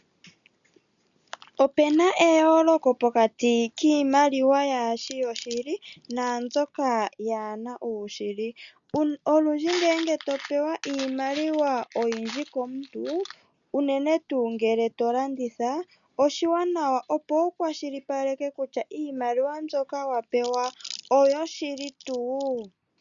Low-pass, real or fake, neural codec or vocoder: 7.2 kHz; real; none